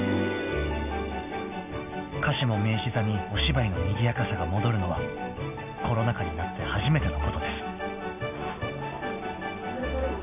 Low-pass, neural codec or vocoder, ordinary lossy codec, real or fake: 3.6 kHz; none; none; real